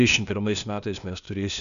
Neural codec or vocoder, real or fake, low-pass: codec, 16 kHz, 0.8 kbps, ZipCodec; fake; 7.2 kHz